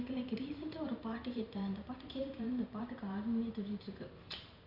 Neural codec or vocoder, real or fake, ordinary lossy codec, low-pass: vocoder, 44.1 kHz, 128 mel bands every 512 samples, BigVGAN v2; fake; none; 5.4 kHz